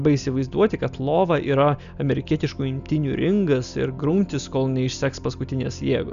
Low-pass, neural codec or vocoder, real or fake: 7.2 kHz; none; real